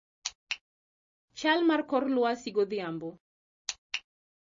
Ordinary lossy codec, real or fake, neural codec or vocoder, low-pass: MP3, 32 kbps; real; none; 7.2 kHz